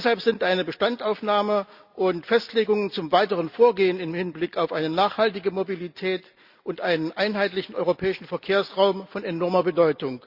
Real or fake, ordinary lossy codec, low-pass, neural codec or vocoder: real; Opus, 64 kbps; 5.4 kHz; none